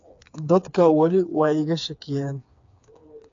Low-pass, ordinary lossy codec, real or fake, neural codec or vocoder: 7.2 kHz; AAC, 48 kbps; fake; codec, 16 kHz, 4 kbps, FreqCodec, smaller model